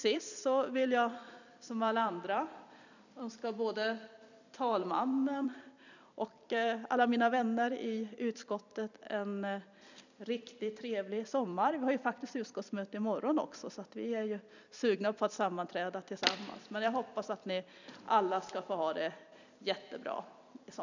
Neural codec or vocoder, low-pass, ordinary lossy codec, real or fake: vocoder, 44.1 kHz, 128 mel bands every 256 samples, BigVGAN v2; 7.2 kHz; none; fake